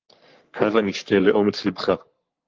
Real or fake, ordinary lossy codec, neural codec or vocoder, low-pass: fake; Opus, 32 kbps; codec, 44.1 kHz, 3.4 kbps, Pupu-Codec; 7.2 kHz